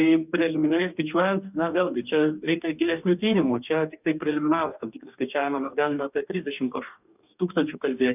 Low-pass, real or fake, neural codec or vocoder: 3.6 kHz; fake; codec, 44.1 kHz, 2.6 kbps, DAC